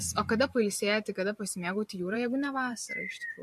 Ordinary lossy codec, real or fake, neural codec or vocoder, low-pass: MP3, 64 kbps; fake; vocoder, 44.1 kHz, 128 mel bands every 512 samples, BigVGAN v2; 14.4 kHz